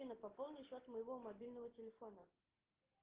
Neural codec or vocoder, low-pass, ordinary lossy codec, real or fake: none; 3.6 kHz; Opus, 16 kbps; real